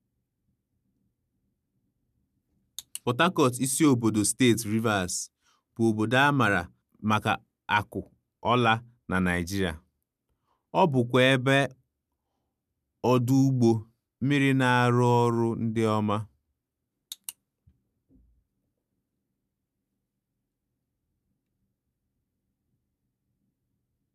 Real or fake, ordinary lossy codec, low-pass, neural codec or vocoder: real; none; 14.4 kHz; none